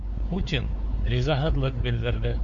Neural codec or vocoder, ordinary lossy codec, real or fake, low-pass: codec, 16 kHz, 4 kbps, X-Codec, WavLM features, trained on Multilingual LibriSpeech; Opus, 64 kbps; fake; 7.2 kHz